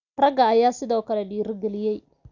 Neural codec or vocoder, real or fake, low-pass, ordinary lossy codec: none; real; 7.2 kHz; none